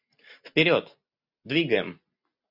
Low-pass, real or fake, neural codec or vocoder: 5.4 kHz; real; none